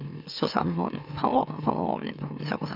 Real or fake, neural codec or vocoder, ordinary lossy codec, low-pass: fake; autoencoder, 44.1 kHz, a latent of 192 numbers a frame, MeloTTS; none; 5.4 kHz